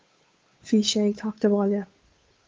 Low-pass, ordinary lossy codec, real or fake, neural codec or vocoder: 7.2 kHz; Opus, 32 kbps; fake; codec, 16 kHz, 8 kbps, FunCodec, trained on Chinese and English, 25 frames a second